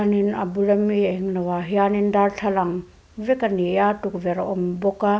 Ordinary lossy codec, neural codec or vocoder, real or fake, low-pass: none; none; real; none